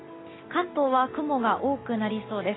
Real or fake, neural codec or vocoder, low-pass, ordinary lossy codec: real; none; 7.2 kHz; AAC, 16 kbps